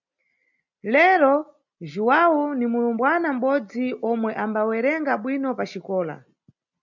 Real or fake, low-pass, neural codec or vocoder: real; 7.2 kHz; none